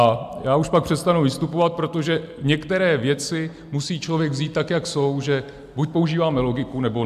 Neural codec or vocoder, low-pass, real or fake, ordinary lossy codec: none; 14.4 kHz; real; MP3, 96 kbps